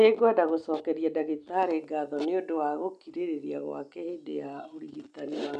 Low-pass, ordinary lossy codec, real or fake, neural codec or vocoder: 9.9 kHz; none; real; none